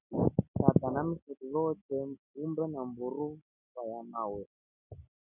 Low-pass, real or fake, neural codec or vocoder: 3.6 kHz; real; none